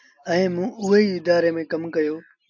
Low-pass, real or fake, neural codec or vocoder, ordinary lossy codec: 7.2 kHz; real; none; AAC, 48 kbps